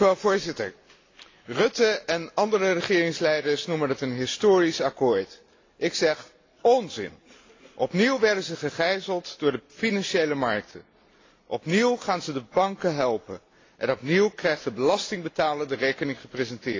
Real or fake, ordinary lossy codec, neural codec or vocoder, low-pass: real; AAC, 32 kbps; none; 7.2 kHz